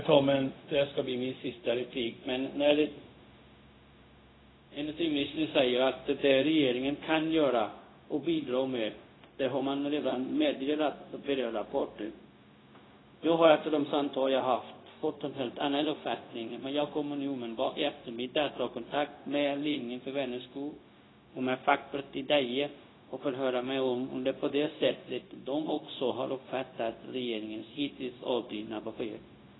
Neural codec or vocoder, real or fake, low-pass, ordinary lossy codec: codec, 16 kHz, 0.4 kbps, LongCat-Audio-Codec; fake; 7.2 kHz; AAC, 16 kbps